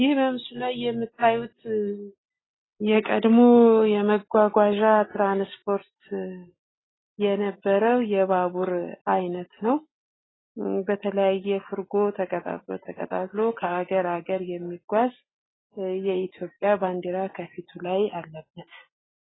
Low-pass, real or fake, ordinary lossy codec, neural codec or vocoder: 7.2 kHz; real; AAC, 16 kbps; none